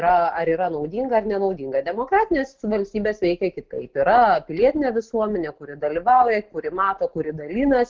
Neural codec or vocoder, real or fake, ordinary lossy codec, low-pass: none; real; Opus, 16 kbps; 7.2 kHz